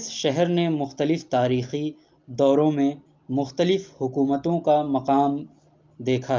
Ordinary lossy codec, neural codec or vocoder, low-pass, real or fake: Opus, 24 kbps; none; 7.2 kHz; real